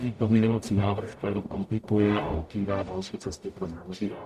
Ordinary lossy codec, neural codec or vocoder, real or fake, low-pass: Opus, 64 kbps; codec, 44.1 kHz, 0.9 kbps, DAC; fake; 14.4 kHz